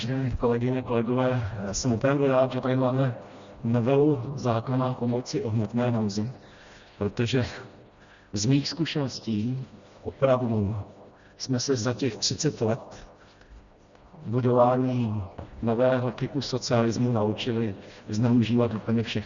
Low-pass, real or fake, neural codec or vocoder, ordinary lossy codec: 7.2 kHz; fake; codec, 16 kHz, 1 kbps, FreqCodec, smaller model; Opus, 64 kbps